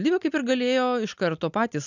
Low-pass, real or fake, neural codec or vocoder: 7.2 kHz; real; none